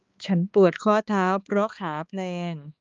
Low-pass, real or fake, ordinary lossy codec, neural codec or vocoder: 7.2 kHz; fake; Opus, 32 kbps; codec, 16 kHz, 2 kbps, X-Codec, HuBERT features, trained on balanced general audio